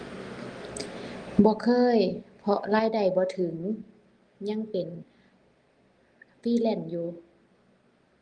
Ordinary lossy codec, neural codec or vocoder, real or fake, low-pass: Opus, 24 kbps; none; real; 9.9 kHz